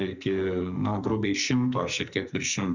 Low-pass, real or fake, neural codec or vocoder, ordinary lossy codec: 7.2 kHz; fake; codec, 44.1 kHz, 2.6 kbps, SNAC; Opus, 64 kbps